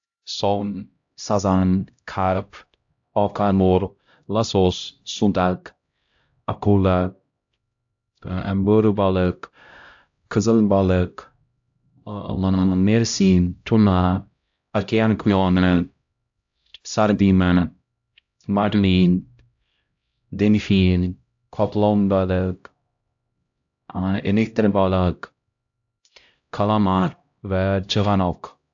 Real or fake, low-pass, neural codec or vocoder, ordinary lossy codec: fake; 7.2 kHz; codec, 16 kHz, 0.5 kbps, X-Codec, HuBERT features, trained on LibriSpeech; AAC, 64 kbps